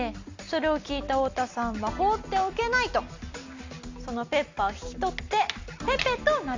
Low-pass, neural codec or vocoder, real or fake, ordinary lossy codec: 7.2 kHz; none; real; MP3, 64 kbps